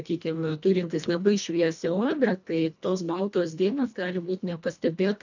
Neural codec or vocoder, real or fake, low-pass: codec, 24 kHz, 1.5 kbps, HILCodec; fake; 7.2 kHz